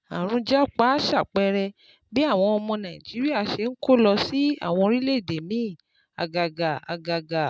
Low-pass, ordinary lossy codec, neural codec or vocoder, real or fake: none; none; none; real